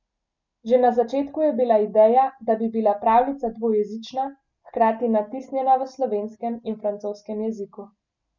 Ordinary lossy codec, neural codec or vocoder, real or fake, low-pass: none; none; real; 7.2 kHz